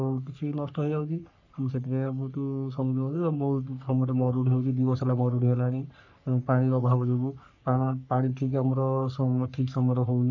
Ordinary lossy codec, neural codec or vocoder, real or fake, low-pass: none; codec, 44.1 kHz, 3.4 kbps, Pupu-Codec; fake; 7.2 kHz